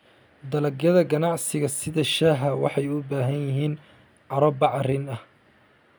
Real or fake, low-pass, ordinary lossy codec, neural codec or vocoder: real; none; none; none